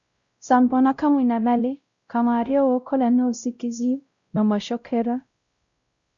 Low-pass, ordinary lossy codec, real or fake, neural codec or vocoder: 7.2 kHz; Opus, 64 kbps; fake; codec, 16 kHz, 0.5 kbps, X-Codec, WavLM features, trained on Multilingual LibriSpeech